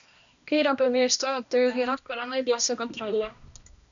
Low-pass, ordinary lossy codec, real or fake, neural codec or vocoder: 7.2 kHz; MP3, 96 kbps; fake; codec, 16 kHz, 1 kbps, X-Codec, HuBERT features, trained on balanced general audio